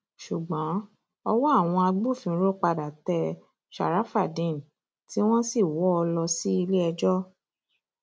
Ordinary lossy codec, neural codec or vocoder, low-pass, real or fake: none; none; none; real